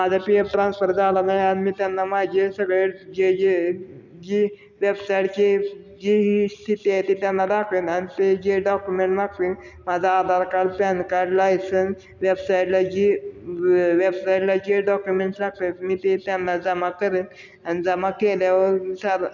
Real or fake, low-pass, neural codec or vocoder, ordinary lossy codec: real; 7.2 kHz; none; none